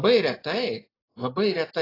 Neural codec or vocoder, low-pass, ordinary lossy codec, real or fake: none; 5.4 kHz; AAC, 24 kbps; real